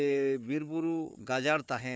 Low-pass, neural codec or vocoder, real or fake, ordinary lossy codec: none; codec, 16 kHz, 4 kbps, FunCodec, trained on Chinese and English, 50 frames a second; fake; none